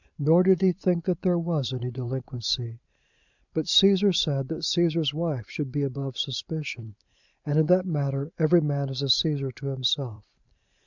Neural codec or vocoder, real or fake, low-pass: vocoder, 44.1 kHz, 128 mel bands every 512 samples, BigVGAN v2; fake; 7.2 kHz